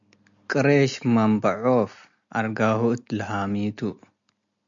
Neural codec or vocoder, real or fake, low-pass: none; real; 7.2 kHz